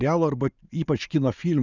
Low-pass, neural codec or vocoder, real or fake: 7.2 kHz; none; real